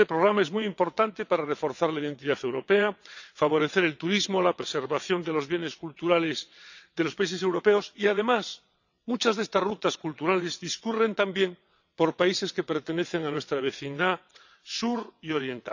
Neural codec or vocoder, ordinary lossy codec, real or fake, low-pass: vocoder, 22.05 kHz, 80 mel bands, WaveNeXt; none; fake; 7.2 kHz